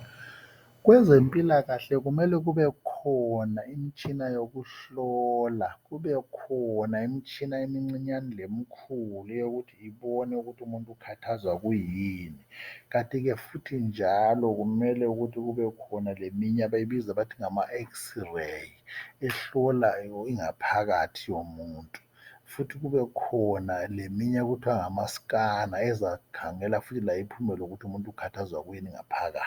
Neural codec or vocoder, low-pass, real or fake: none; 19.8 kHz; real